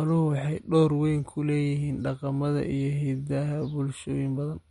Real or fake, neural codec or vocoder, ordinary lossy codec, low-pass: real; none; MP3, 48 kbps; 19.8 kHz